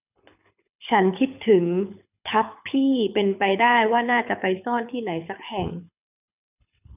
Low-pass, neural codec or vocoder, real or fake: 3.6 kHz; codec, 24 kHz, 6 kbps, HILCodec; fake